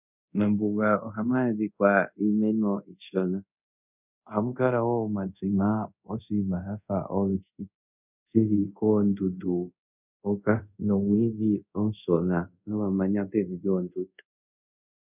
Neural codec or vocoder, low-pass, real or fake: codec, 24 kHz, 0.5 kbps, DualCodec; 3.6 kHz; fake